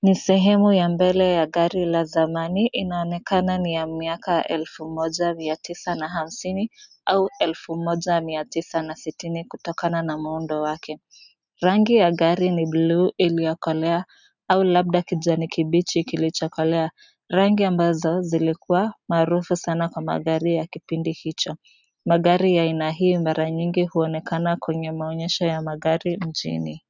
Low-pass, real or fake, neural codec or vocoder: 7.2 kHz; real; none